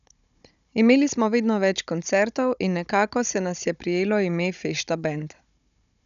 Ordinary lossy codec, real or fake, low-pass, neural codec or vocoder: none; fake; 7.2 kHz; codec, 16 kHz, 16 kbps, FunCodec, trained on Chinese and English, 50 frames a second